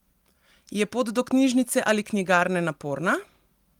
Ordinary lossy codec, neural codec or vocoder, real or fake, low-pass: Opus, 24 kbps; none; real; 19.8 kHz